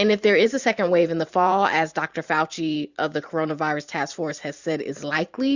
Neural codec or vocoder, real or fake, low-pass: vocoder, 44.1 kHz, 128 mel bands every 512 samples, BigVGAN v2; fake; 7.2 kHz